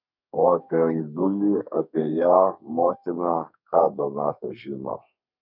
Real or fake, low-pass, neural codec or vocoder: fake; 5.4 kHz; codec, 32 kHz, 1.9 kbps, SNAC